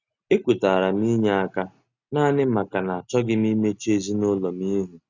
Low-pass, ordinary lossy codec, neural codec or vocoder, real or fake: 7.2 kHz; Opus, 64 kbps; none; real